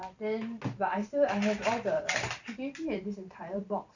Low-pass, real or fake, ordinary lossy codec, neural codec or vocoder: 7.2 kHz; fake; none; vocoder, 44.1 kHz, 128 mel bands every 512 samples, BigVGAN v2